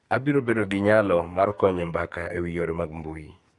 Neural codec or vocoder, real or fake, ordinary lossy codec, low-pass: codec, 44.1 kHz, 2.6 kbps, SNAC; fake; Opus, 64 kbps; 10.8 kHz